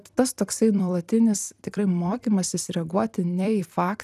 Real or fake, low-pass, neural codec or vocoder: fake; 14.4 kHz; vocoder, 44.1 kHz, 128 mel bands every 256 samples, BigVGAN v2